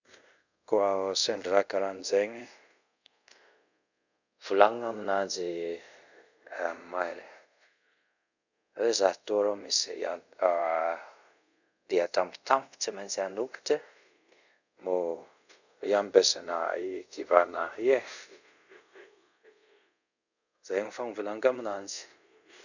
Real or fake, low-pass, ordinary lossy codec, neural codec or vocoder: fake; 7.2 kHz; none; codec, 24 kHz, 0.5 kbps, DualCodec